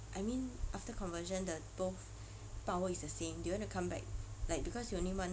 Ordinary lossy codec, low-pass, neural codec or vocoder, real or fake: none; none; none; real